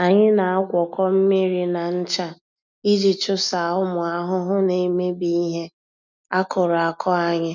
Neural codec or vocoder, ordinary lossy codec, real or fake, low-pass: none; none; real; 7.2 kHz